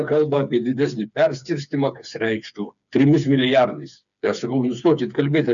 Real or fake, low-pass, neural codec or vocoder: fake; 7.2 kHz; codec, 16 kHz, 4 kbps, FreqCodec, smaller model